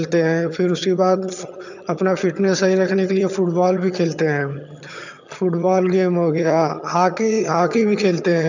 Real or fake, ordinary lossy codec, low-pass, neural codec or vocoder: fake; none; 7.2 kHz; vocoder, 22.05 kHz, 80 mel bands, HiFi-GAN